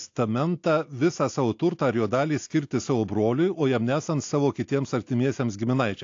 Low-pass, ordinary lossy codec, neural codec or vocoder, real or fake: 7.2 kHz; AAC, 48 kbps; none; real